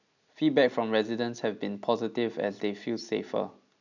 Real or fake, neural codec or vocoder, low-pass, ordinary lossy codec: real; none; 7.2 kHz; none